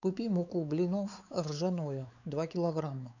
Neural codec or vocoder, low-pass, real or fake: codec, 16 kHz, 4 kbps, X-Codec, WavLM features, trained on Multilingual LibriSpeech; 7.2 kHz; fake